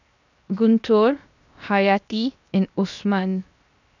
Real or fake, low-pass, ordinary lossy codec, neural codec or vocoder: fake; 7.2 kHz; none; codec, 16 kHz, 0.7 kbps, FocalCodec